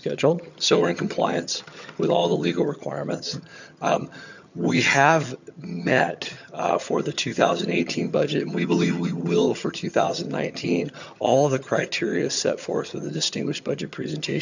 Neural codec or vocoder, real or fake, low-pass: vocoder, 22.05 kHz, 80 mel bands, HiFi-GAN; fake; 7.2 kHz